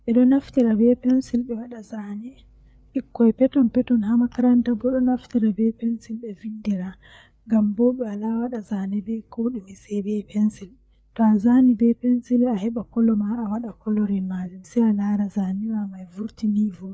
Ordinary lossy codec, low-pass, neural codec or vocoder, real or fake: none; none; codec, 16 kHz, 4 kbps, FreqCodec, larger model; fake